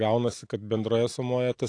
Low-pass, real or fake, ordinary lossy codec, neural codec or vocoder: 9.9 kHz; real; AAC, 48 kbps; none